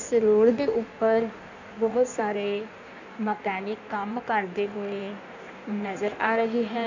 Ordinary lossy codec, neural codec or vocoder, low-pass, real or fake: none; codec, 16 kHz in and 24 kHz out, 1.1 kbps, FireRedTTS-2 codec; 7.2 kHz; fake